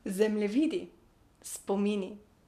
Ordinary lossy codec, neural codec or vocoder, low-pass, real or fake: none; none; 14.4 kHz; real